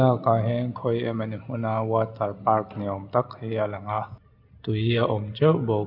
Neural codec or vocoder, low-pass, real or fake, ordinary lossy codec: none; 5.4 kHz; real; none